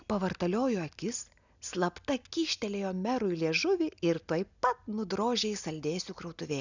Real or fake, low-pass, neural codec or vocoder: real; 7.2 kHz; none